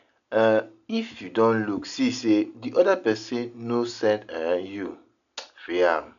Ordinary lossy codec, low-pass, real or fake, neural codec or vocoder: none; 7.2 kHz; real; none